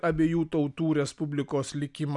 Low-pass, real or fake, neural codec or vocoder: 10.8 kHz; real; none